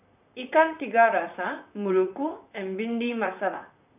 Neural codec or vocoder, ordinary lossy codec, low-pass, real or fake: vocoder, 44.1 kHz, 128 mel bands, Pupu-Vocoder; none; 3.6 kHz; fake